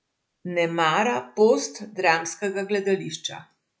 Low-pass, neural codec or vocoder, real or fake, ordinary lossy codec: none; none; real; none